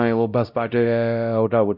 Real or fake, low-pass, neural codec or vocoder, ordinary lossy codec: fake; 5.4 kHz; codec, 16 kHz, 0.5 kbps, X-Codec, WavLM features, trained on Multilingual LibriSpeech; none